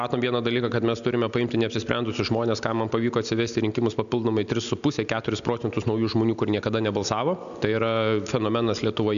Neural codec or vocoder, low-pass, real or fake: none; 7.2 kHz; real